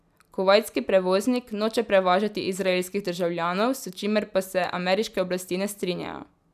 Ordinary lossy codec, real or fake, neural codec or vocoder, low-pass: none; real; none; 14.4 kHz